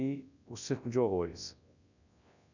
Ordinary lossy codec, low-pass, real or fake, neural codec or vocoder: none; 7.2 kHz; fake; codec, 24 kHz, 0.9 kbps, WavTokenizer, large speech release